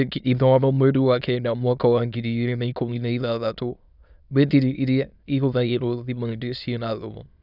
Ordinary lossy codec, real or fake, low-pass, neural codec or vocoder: none; fake; 5.4 kHz; autoencoder, 22.05 kHz, a latent of 192 numbers a frame, VITS, trained on many speakers